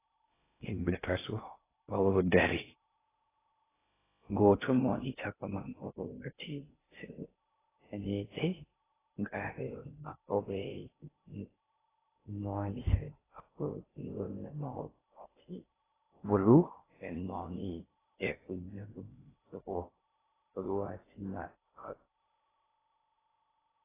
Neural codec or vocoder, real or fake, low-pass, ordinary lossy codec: codec, 16 kHz in and 24 kHz out, 0.6 kbps, FocalCodec, streaming, 2048 codes; fake; 3.6 kHz; AAC, 16 kbps